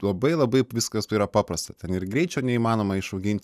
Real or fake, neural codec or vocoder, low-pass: real; none; 14.4 kHz